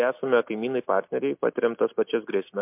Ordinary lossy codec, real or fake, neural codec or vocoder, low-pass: MP3, 32 kbps; real; none; 3.6 kHz